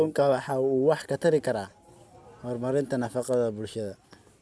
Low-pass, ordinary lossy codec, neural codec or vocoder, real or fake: none; none; none; real